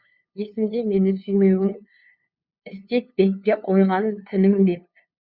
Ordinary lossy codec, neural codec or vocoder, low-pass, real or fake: Opus, 64 kbps; codec, 16 kHz, 2 kbps, FunCodec, trained on LibriTTS, 25 frames a second; 5.4 kHz; fake